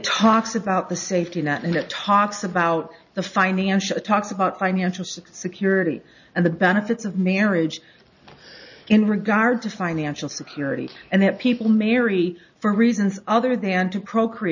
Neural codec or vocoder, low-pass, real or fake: none; 7.2 kHz; real